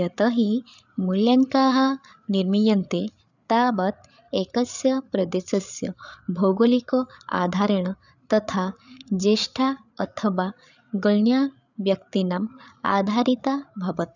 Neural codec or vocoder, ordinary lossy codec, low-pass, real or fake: codec, 16 kHz, 8 kbps, FreqCodec, larger model; none; 7.2 kHz; fake